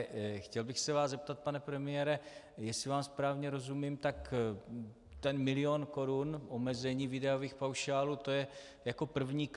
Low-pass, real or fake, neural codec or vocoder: 10.8 kHz; real; none